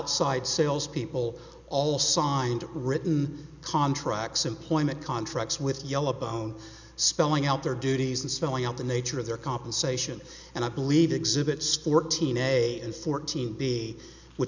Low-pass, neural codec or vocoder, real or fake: 7.2 kHz; none; real